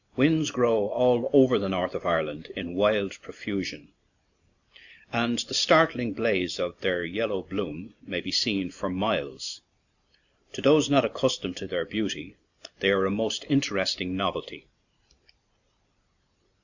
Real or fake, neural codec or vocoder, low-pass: real; none; 7.2 kHz